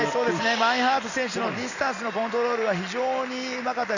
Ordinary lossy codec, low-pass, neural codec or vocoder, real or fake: none; 7.2 kHz; none; real